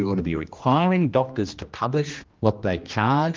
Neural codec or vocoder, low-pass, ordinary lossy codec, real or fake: codec, 16 kHz, 1 kbps, X-Codec, HuBERT features, trained on general audio; 7.2 kHz; Opus, 32 kbps; fake